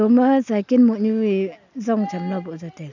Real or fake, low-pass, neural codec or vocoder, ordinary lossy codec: real; 7.2 kHz; none; none